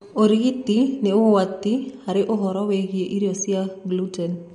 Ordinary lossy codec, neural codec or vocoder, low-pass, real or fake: MP3, 48 kbps; none; 19.8 kHz; real